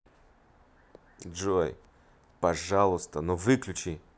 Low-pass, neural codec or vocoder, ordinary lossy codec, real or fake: none; none; none; real